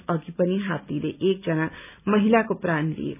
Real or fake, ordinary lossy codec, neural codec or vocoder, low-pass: real; none; none; 3.6 kHz